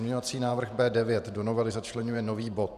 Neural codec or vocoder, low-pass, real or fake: vocoder, 48 kHz, 128 mel bands, Vocos; 14.4 kHz; fake